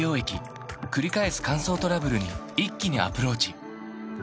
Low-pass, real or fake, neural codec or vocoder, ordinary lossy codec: none; real; none; none